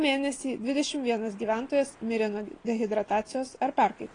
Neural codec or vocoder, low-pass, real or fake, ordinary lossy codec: none; 9.9 kHz; real; AAC, 32 kbps